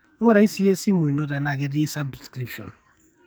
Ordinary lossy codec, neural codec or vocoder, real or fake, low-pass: none; codec, 44.1 kHz, 2.6 kbps, SNAC; fake; none